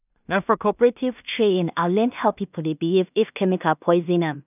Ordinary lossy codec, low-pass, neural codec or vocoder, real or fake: none; 3.6 kHz; codec, 16 kHz in and 24 kHz out, 0.4 kbps, LongCat-Audio-Codec, two codebook decoder; fake